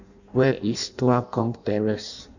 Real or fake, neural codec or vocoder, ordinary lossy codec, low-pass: fake; codec, 16 kHz in and 24 kHz out, 0.6 kbps, FireRedTTS-2 codec; none; 7.2 kHz